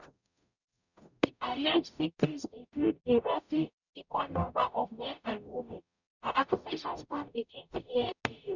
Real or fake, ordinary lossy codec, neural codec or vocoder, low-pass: fake; none; codec, 44.1 kHz, 0.9 kbps, DAC; 7.2 kHz